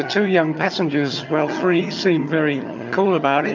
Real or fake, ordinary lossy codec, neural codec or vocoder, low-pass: fake; MP3, 64 kbps; vocoder, 22.05 kHz, 80 mel bands, HiFi-GAN; 7.2 kHz